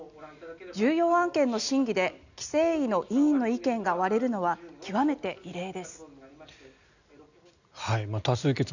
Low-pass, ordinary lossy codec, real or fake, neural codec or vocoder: 7.2 kHz; none; real; none